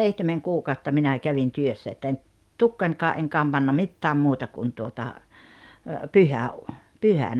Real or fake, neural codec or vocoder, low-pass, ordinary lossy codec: fake; codec, 44.1 kHz, 7.8 kbps, DAC; 19.8 kHz; Opus, 32 kbps